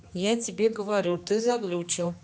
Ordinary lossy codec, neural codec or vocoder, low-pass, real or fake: none; codec, 16 kHz, 2 kbps, X-Codec, HuBERT features, trained on general audio; none; fake